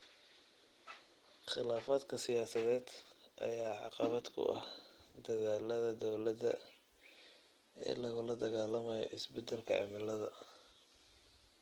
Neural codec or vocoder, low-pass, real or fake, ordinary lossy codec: none; 19.8 kHz; real; Opus, 16 kbps